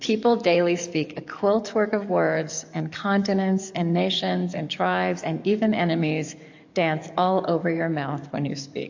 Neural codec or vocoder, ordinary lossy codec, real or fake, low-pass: codec, 44.1 kHz, 7.8 kbps, DAC; AAC, 48 kbps; fake; 7.2 kHz